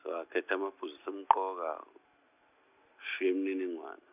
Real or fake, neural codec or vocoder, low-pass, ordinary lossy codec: real; none; 3.6 kHz; none